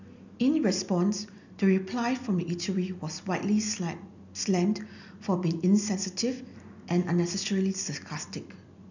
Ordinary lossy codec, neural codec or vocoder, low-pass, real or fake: none; none; 7.2 kHz; real